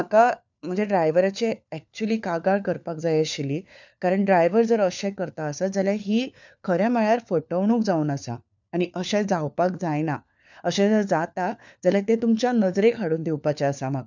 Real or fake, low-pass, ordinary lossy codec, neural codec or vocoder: fake; 7.2 kHz; none; codec, 16 kHz, 4 kbps, FunCodec, trained on LibriTTS, 50 frames a second